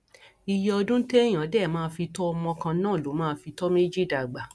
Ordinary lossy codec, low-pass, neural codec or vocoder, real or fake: none; none; none; real